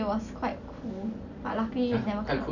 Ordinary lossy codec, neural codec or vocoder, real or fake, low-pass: none; none; real; 7.2 kHz